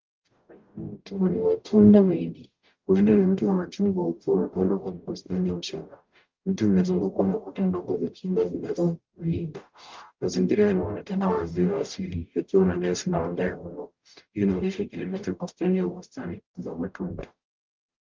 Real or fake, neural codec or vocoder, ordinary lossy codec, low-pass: fake; codec, 44.1 kHz, 0.9 kbps, DAC; Opus, 32 kbps; 7.2 kHz